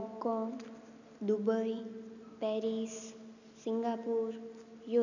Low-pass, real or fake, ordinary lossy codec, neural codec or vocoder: 7.2 kHz; real; none; none